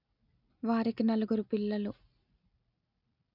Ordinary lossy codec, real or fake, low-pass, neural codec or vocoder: none; real; 5.4 kHz; none